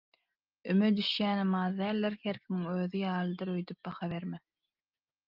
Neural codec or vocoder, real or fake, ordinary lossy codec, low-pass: none; real; Opus, 32 kbps; 5.4 kHz